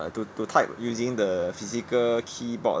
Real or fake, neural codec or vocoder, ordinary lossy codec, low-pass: real; none; none; none